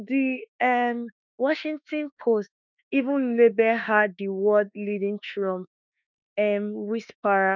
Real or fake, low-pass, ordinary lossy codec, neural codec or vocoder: fake; 7.2 kHz; none; autoencoder, 48 kHz, 32 numbers a frame, DAC-VAE, trained on Japanese speech